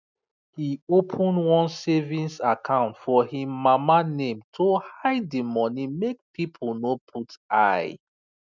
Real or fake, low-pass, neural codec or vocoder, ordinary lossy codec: real; 7.2 kHz; none; none